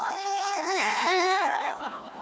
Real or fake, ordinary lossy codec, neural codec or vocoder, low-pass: fake; none; codec, 16 kHz, 1 kbps, FunCodec, trained on Chinese and English, 50 frames a second; none